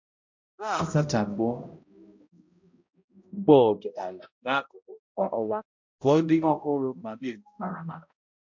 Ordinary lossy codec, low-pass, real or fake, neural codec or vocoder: MP3, 48 kbps; 7.2 kHz; fake; codec, 16 kHz, 0.5 kbps, X-Codec, HuBERT features, trained on balanced general audio